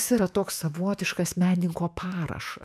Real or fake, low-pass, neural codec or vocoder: fake; 14.4 kHz; autoencoder, 48 kHz, 128 numbers a frame, DAC-VAE, trained on Japanese speech